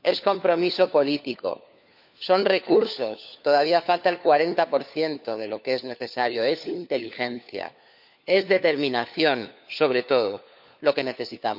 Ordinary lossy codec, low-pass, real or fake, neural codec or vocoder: none; 5.4 kHz; fake; codec, 16 kHz, 4 kbps, FunCodec, trained on LibriTTS, 50 frames a second